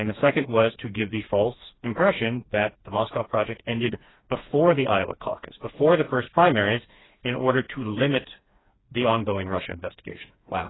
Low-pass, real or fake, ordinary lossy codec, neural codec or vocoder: 7.2 kHz; fake; AAC, 16 kbps; codec, 16 kHz, 2 kbps, FreqCodec, smaller model